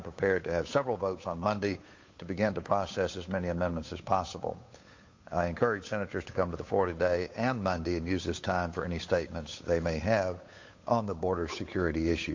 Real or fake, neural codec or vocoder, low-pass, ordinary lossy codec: fake; codec, 16 kHz, 8 kbps, FunCodec, trained on Chinese and English, 25 frames a second; 7.2 kHz; MP3, 48 kbps